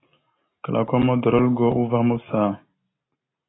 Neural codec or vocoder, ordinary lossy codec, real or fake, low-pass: none; AAC, 16 kbps; real; 7.2 kHz